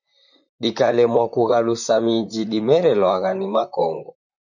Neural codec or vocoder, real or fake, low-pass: vocoder, 44.1 kHz, 128 mel bands, Pupu-Vocoder; fake; 7.2 kHz